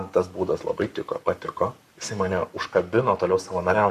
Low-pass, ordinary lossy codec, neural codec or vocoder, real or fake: 14.4 kHz; MP3, 64 kbps; codec, 44.1 kHz, 7.8 kbps, Pupu-Codec; fake